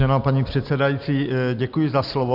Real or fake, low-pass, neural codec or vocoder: real; 5.4 kHz; none